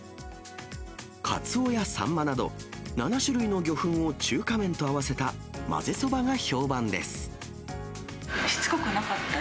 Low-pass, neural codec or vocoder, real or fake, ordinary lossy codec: none; none; real; none